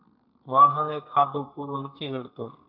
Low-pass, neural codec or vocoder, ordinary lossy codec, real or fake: 5.4 kHz; codec, 32 kHz, 1.9 kbps, SNAC; AAC, 48 kbps; fake